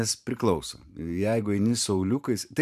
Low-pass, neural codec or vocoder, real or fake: 14.4 kHz; vocoder, 44.1 kHz, 128 mel bands every 512 samples, BigVGAN v2; fake